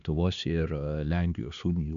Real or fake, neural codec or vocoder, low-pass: fake; codec, 16 kHz, 2 kbps, X-Codec, HuBERT features, trained on LibriSpeech; 7.2 kHz